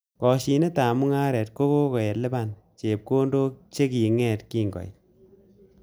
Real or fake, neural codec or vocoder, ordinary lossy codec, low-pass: real; none; none; none